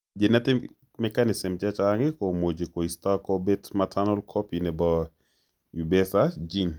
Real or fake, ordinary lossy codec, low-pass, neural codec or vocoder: real; Opus, 32 kbps; 19.8 kHz; none